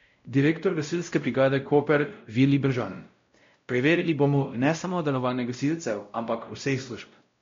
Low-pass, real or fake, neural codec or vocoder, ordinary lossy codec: 7.2 kHz; fake; codec, 16 kHz, 0.5 kbps, X-Codec, WavLM features, trained on Multilingual LibriSpeech; MP3, 48 kbps